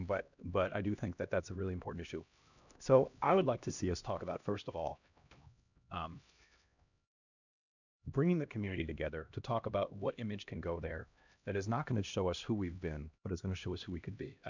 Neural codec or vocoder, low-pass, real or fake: codec, 16 kHz, 1 kbps, X-Codec, HuBERT features, trained on LibriSpeech; 7.2 kHz; fake